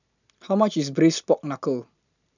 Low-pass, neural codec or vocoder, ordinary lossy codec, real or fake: 7.2 kHz; none; none; real